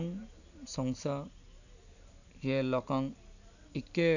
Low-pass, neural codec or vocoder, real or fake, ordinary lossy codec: 7.2 kHz; none; real; none